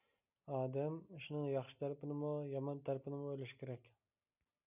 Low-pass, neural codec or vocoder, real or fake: 3.6 kHz; none; real